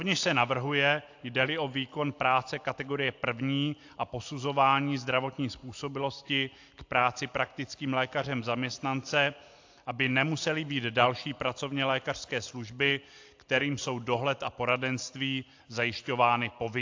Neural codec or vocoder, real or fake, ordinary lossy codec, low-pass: none; real; AAC, 48 kbps; 7.2 kHz